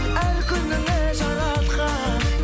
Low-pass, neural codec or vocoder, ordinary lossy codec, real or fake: none; none; none; real